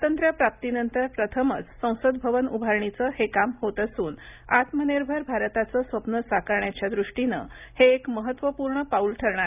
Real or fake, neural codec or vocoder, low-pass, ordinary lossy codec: real; none; 3.6 kHz; none